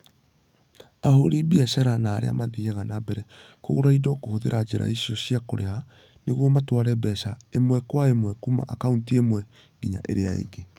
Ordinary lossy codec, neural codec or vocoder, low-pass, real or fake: none; codec, 44.1 kHz, 7.8 kbps, DAC; 19.8 kHz; fake